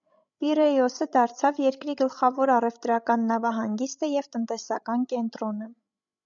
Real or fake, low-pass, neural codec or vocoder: fake; 7.2 kHz; codec, 16 kHz, 16 kbps, FreqCodec, larger model